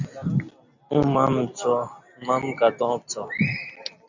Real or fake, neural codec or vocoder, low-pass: real; none; 7.2 kHz